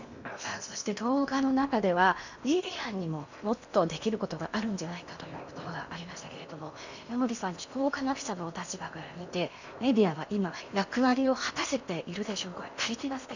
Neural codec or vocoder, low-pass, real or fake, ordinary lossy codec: codec, 16 kHz in and 24 kHz out, 0.8 kbps, FocalCodec, streaming, 65536 codes; 7.2 kHz; fake; none